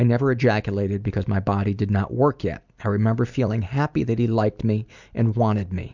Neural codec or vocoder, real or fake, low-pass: none; real; 7.2 kHz